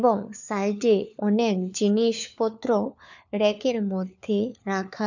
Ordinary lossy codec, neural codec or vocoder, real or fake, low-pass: none; codec, 16 kHz, 4 kbps, FunCodec, trained on LibriTTS, 50 frames a second; fake; 7.2 kHz